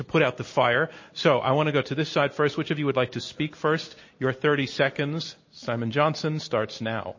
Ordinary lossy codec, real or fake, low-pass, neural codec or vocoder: MP3, 32 kbps; real; 7.2 kHz; none